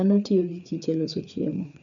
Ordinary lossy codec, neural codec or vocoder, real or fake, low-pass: none; codec, 16 kHz, 4 kbps, FreqCodec, larger model; fake; 7.2 kHz